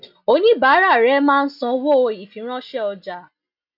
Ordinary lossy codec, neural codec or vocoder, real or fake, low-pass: none; none; real; 5.4 kHz